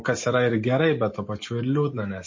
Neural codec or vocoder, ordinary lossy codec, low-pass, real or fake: none; MP3, 32 kbps; 7.2 kHz; real